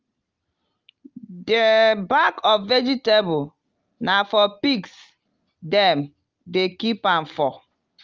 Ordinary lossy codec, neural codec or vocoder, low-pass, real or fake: Opus, 32 kbps; none; 7.2 kHz; real